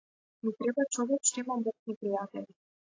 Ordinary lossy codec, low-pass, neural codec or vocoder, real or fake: AAC, 32 kbps; 7.2 kHz; none; real